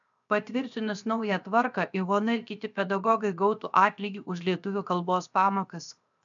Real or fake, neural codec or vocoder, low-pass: fake; codec, 16 kHz, 0.7 kbps, FocalCodec; 7.2 kHz